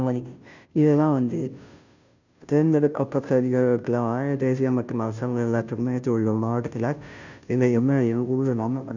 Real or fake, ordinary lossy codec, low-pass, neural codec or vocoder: fake; none; 7.2 kHz; codec, 16 kHz, 0.5 kbps, FunCodec, trained on Chinese and English, 25 frames a second